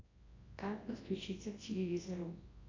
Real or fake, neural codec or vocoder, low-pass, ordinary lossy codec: fake; codec, 24 kHz, 0.9 kbps, WavTokenizer, large speech release; 7.2 kHz; AAC, 32 kbps